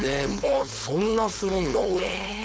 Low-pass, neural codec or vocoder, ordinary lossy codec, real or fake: none; codec, 16 kHz, 4.8 kbps, FACodec; none; fake